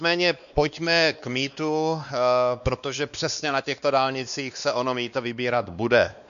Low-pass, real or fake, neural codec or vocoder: 7.2 kHz; fake; codec, 16 kHz, 2 kbps, X-Codec, WavLM features, trained on Multilingual LibriSpeech